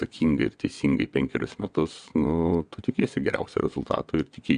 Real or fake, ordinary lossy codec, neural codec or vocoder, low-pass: fake; AAC, 96 kbps; vocoder, 22.05 kHz, 80 mel bands, WaveNeXt; 9.9 kHz